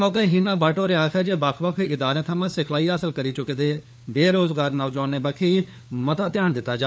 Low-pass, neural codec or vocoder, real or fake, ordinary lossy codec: none; codec, 16 kHz, 4 kbps, FunCodec, trained on LibriTTS, 50 frames a second; fake; none